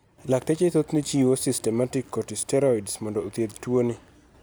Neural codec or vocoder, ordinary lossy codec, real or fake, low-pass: none; none; real; none